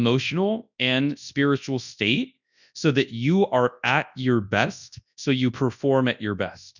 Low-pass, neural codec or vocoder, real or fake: 7.2 kHz; codec, 24 kHz, 0.9 kbps, WavTokenizer, large speech release; fake